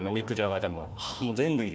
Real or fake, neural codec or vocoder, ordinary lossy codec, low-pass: fake; codec, 16 kHz, 1 kbps, FunCodec, trained on Chinese and English, 50 frames a second; none; none